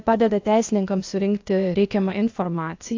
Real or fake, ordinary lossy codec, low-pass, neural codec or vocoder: fake; AAC, 48 kbps; 7.2 kHz; codec, 16 kHz, 0.8 kbps, ZipCodec